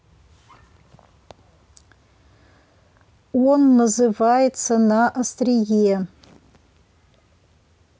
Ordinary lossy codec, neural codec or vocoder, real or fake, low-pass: none; none; real; none